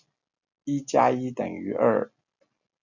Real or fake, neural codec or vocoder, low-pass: real; none; 7.2 kHz